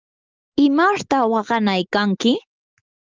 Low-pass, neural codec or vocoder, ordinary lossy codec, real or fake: 7.2 kHz; none; Opus, 32 kbps; real